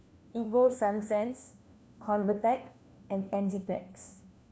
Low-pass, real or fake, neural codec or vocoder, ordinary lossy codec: none; fake; codec, 16 kHz, 1 kbps, FunCodec, trained on LibriTTS, 50 frames a second; none